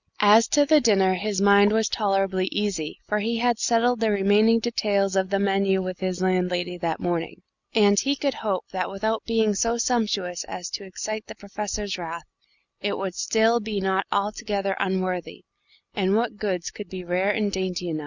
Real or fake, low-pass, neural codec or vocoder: real; 7.2 kHz; none